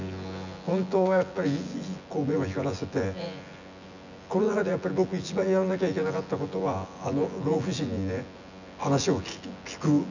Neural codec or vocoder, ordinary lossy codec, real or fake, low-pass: vocoder, 24 kHz, 100 mel bands, Vocos; none; fake; 7.2 kHz